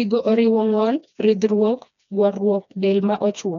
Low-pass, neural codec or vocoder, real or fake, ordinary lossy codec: 7.2 kHz; codec, 16 kHz, 2 kbps, FreqCodec, smaller model; fake; none